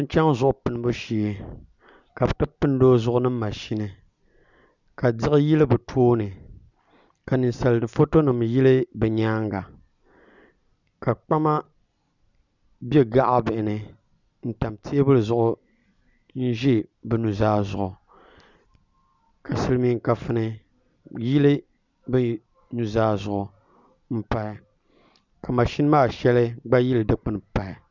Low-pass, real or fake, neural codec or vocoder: 7.2 kHz; real; none